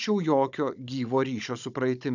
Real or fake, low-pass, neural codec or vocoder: fake; 7.2 kHz; codec, 16 kHz, 16 kbps, FunCodec, trained on Chinese and English, 50 frames a second